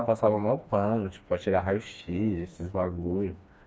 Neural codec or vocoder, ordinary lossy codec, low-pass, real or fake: codec, 16 kHz, 2 kbps, FreqCodec, smaller model; none; none; fake